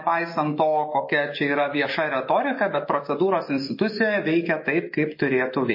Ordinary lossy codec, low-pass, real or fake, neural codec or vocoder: MP3, 24 kbps; 5.4 kHz; fake; autoencoder, 48 kHz, 128 numbers a frame, DAC-VAE, trained on Japanese speech